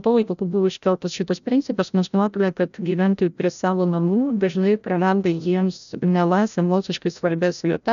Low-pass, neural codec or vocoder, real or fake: 7.2 kHz; codec, 16 kHz, 0.5 kbps, FreqCodec, larger model; fake